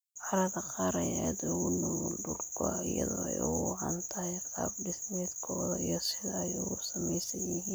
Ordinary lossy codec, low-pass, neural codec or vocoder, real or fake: none; none; none; real